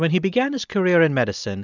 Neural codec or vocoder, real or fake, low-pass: none; real; 7.2 kHz